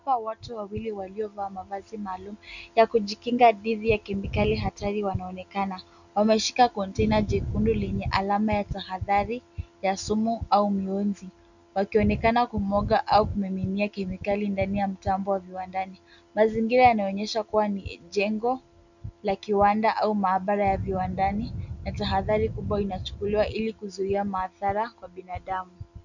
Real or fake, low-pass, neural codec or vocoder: real; 7.2 kHz; none